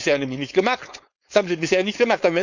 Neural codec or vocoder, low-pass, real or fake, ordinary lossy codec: codec, 16 kHz, 4.8 kbps, FACodec; 7.2 kHz; fake; none